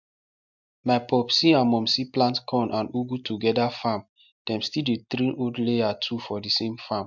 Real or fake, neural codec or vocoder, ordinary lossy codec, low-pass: real; none; MP3, 64 kbps; 7.2 kHz